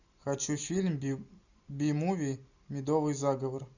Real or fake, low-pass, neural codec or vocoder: real; 7.2 kHz; none